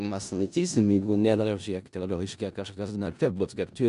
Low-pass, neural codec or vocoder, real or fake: 10.8 kHz; codec, 16 kHz in and 24 kHz out, 0.4 kbps, LongCat-Audio-Codec, four codebook decoder; fake